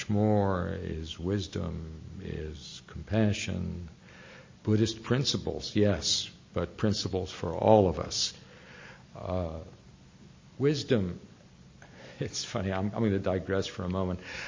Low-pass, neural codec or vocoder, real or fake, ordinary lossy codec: 7.2 kHz; none; real; MP3, 32 kbps